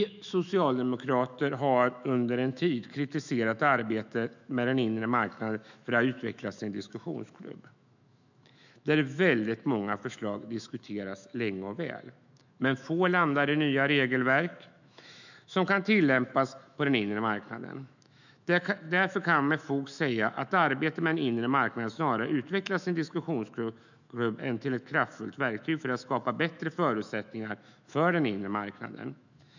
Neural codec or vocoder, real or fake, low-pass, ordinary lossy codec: autoencoder, 48 kHz, 128 numbers a frame, DAC-VAE, trained on Japanese speech; fake; 7.2 kHz; none